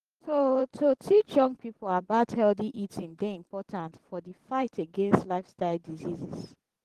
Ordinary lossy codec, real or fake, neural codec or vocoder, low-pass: Opus, 16 kbps; fake; vocoder, 44.1 kHz, 128 mel bands every 512 samples, BigVGAN v2; 14.4 kHz